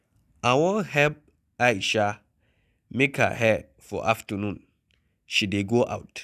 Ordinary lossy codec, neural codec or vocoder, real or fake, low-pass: none; none; real; 14.4 kHz